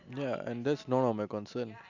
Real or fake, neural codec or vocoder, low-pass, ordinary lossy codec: real; none; 7.2 kHz; none